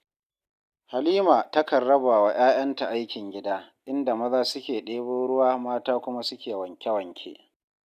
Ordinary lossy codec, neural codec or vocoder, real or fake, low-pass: none; none; real; 14.4 kHz